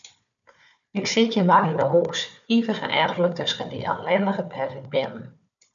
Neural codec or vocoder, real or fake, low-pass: codec, 16 kHz, 4 kbps, FunCodec, trained on Chinese and English, 50 frames a second; fake; 7.2 kHz